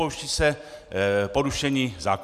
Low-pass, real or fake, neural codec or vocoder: 14.4 kHz; real; none